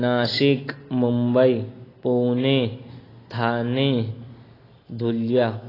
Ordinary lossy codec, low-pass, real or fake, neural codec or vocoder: AAC, 24 kbps; 5.4 kHz; real; none